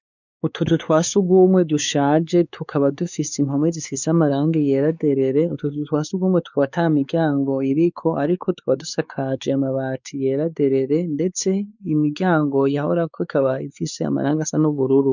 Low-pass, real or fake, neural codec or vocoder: 7.2 kHz; fake; codec, 16 kHz, 4 kbps, X-Codec, WavLM features, trained on Multilingual LibriSpeech